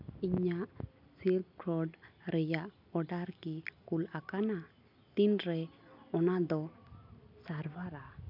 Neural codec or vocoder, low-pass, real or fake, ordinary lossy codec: none; 5.4 kHz; real; MP3, 48 kbps